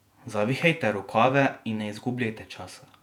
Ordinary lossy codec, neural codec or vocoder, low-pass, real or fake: none; vocoder, 48 kHz, 128 mel bands, Vocos; 19.8 kHz; fake